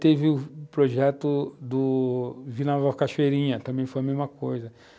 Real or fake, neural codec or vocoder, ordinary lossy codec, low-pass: real; none; none; none